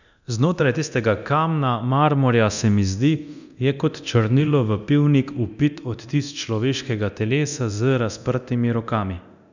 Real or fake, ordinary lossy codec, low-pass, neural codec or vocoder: fake; none; 7.2 kHz; codec, 24 kHz, 0.9 kbps, DualCodec